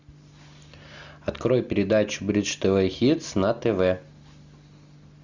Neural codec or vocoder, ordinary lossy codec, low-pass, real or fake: none; Opus, 64 kbps; 7.2 kHz; real